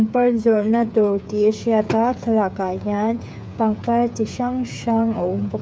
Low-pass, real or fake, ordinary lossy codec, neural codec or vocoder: none; fake; none; codec, 16 kHz, 8 kbps, FreqCodec, smaller model